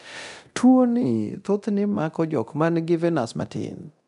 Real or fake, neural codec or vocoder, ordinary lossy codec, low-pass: fake; codec, 24 kHz, 0.9 kbps, DualCodec; none; 10.8 kHz